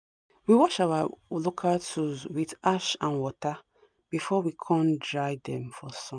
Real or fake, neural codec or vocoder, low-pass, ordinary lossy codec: real; none; 9.9 kHz; none